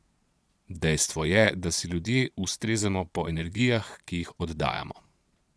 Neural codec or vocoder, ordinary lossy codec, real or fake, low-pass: vocoder, 22.05 kHz, 80 mel bands, Vocos; none; fake; none